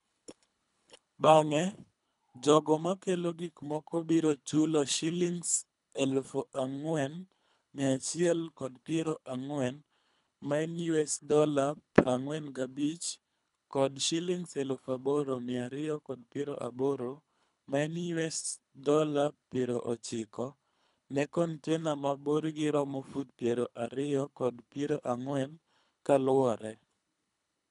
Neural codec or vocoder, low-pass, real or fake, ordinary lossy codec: codec, 24 kHz, 3 kbps, HILCodec; 10.8 kHz; fake; none